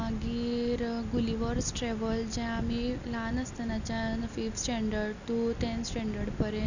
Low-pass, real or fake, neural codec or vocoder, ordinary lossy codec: 7.2 kHz; real; none; none